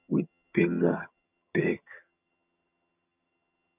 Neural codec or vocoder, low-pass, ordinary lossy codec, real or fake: vocoder, 22.05 kHz, 80 mel bands, HiFi-GAN; 3.6 kHz; none; fake